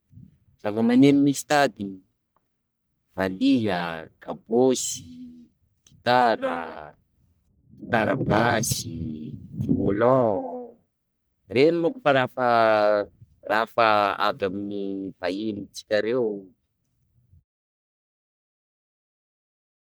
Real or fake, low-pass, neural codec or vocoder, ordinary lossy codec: fake; none; codec, 44.1 kHz, 1.7 kbps, Pupu-Codec; none